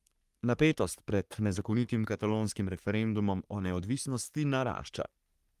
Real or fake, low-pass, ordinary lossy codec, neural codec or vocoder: fake; 14.4 kHz; Opus, 32 kbps; codec, 44.1 kHz, 3.4 kbps, Pupu-Codec